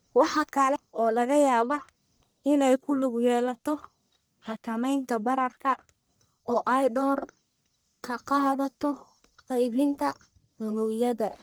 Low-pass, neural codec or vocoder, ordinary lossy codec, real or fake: none; codec, 44.1 kHz, 1.7 kbps, Pupu-Codec; none; fake